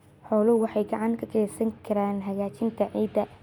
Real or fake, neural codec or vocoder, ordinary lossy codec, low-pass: real; none; none; 19.8 kHz